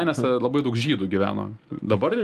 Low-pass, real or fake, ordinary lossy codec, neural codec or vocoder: 14.4 kHz; real; Opus, 32 kbps; none